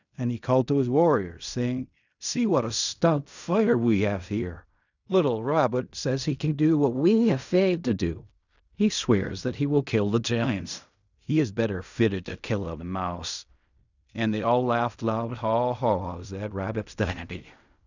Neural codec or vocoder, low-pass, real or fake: codec, 16 kHz in and 24 kHz out, 0.4 kbps, LongCat-Audio-Codec, fine tuned four codebook decoder; 7.2 kHz; fake